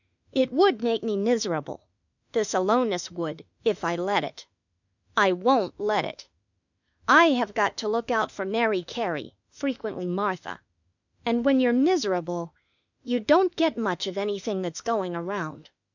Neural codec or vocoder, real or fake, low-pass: autoencoder, 48 kHz, 32 numbers a frame, DAC-VAE, trained on Japanese speech; fake; 7.2 kHz